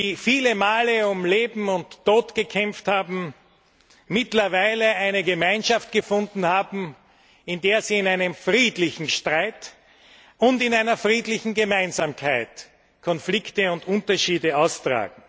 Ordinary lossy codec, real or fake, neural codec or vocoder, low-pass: none; real; none; none